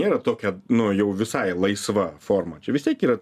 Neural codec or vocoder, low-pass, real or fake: none; 14.4 kHz; real